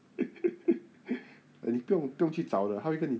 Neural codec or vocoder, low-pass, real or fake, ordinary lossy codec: none; none; real; none